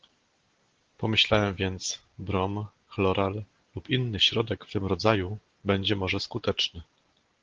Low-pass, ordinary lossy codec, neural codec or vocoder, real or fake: 7.2 kHz; Opus, 16 kbps; none; real